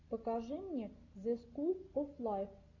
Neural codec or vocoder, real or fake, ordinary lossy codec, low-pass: none; real; AAC, 48 kbps; 7.2 kHz